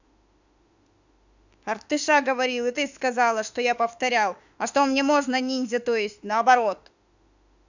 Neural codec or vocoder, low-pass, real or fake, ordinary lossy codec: autoencoder, 48 kHz, 32 numbers a frame, DAC-VAE, trained on Japanese speech; 7.2 kHz; fake; none